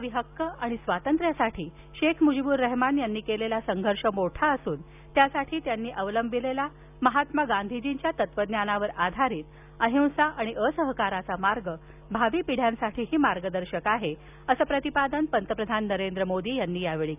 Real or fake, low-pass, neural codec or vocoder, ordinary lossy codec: real; 3.6 kHz; none; none